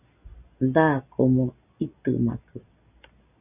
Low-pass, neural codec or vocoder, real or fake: 3.6 kHz; none; real